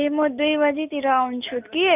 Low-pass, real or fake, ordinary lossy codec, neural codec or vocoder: 3.6 kHz; real; none; none